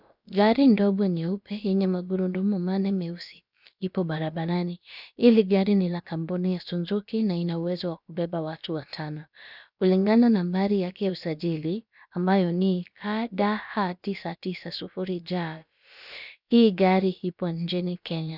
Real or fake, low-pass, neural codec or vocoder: fake; 5.4 kHz; codec, 16 kHz, about 1 kbps, DyCAST, with the encoder's durations